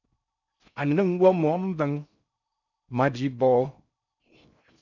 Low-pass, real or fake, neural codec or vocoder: 7.2 kHz; fake; codec, 16 kHz in and 24 kHz out, 0.6 kbps, FocalCodec, streaming, 4096 codes